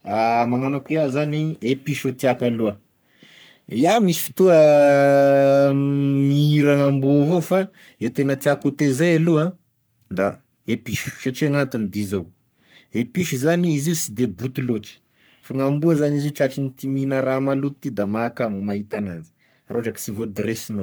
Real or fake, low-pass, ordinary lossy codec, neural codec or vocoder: fake; none; none; codec, 44.1 kHz, 3.4 kbps, Pupu-Codec